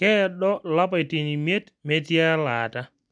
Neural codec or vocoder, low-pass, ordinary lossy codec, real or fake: none; 9.9 kHz; MP3, 96 kbps; real